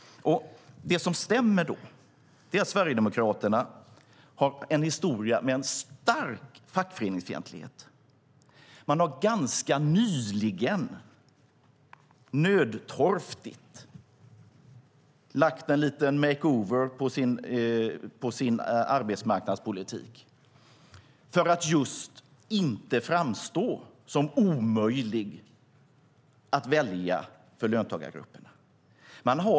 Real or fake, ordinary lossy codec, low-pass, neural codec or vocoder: real; none; none; none